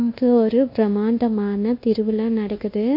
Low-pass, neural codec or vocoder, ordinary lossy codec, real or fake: 5.4 kHz; codec, 24 kHz, 1.2 kbps, DualCodec; MP3, 32 kbps; fake